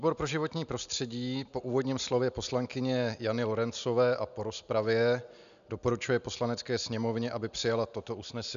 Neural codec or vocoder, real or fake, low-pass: none; real; 7.2 kHz